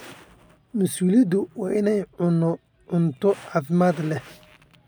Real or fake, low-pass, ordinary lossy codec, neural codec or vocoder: real; none; none; none